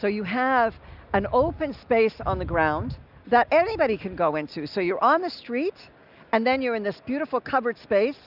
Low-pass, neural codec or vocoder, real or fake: 5.4 kHz; none; real